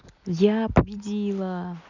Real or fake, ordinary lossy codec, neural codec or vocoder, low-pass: real; none; none; 7.2 kHz